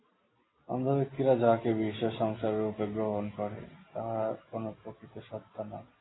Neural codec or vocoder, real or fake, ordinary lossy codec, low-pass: none; real; AAC, 16 kbps; 7.2 kHz